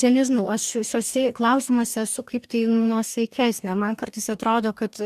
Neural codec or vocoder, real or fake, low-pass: codec, 44.1 kHz, 2.6 kbps, DAC; fake; 14.4 kHz